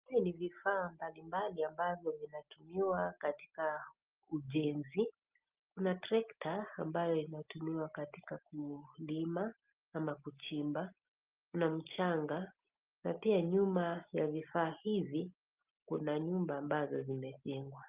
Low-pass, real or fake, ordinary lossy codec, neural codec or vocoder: 3.6 kHz; real; Opus, 24 kbps; none